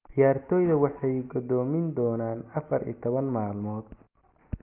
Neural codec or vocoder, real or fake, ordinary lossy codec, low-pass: none; real; Opus, 24 kbps; 3.6 kHz